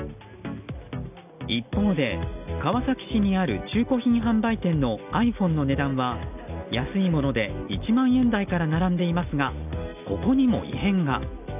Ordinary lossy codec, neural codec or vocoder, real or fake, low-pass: none; none; real; 3.6 kHz